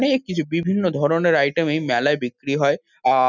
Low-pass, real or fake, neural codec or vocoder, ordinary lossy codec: 7.2 kHz; real; none; none